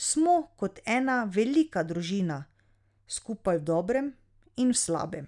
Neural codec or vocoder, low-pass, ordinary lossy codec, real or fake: none; 10.8 kHz; none; real